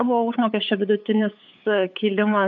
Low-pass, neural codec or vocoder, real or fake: 7.2 kHz; codec, 16 kHz, 8 kbps, FunCodec, trained on LibriTTS, 25 frames a second; fake